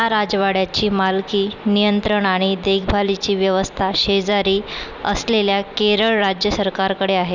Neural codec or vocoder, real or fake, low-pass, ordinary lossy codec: none; real; 7.2 kHz; none